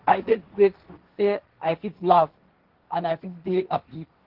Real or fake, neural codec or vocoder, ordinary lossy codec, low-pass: fake; codec, 16 kHz, 1.1 kbps, Voila-Tokenizer; Opus, 16 kbps; 5.4 kHz